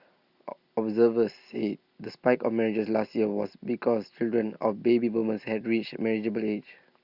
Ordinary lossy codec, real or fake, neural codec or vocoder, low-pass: Opus, 64 kbps; real; none; 5.4 kHz